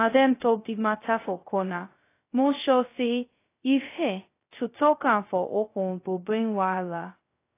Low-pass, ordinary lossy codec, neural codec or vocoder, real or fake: 3.6 kHz; AAC, 24 kbps; codec, 16 kHz, 0.2 kbps, FocalCodec; fake